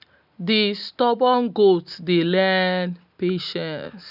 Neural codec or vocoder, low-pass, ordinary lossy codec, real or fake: none; 5.4 kHz; none; real